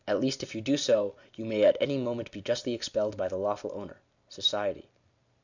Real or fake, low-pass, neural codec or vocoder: real; 7.2 kHz; none